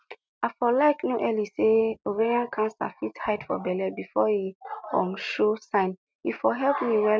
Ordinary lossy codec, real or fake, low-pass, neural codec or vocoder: none; real; none; none